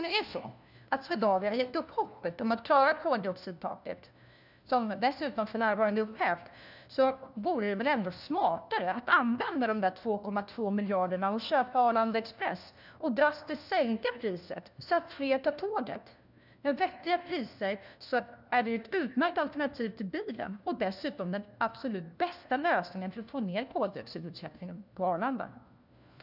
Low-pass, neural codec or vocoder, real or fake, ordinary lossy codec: 5.4 kHz; codec, 16 kHz, 1 kbps, FunCodec, trained on LibriTTS, 50 frames a second; fake; none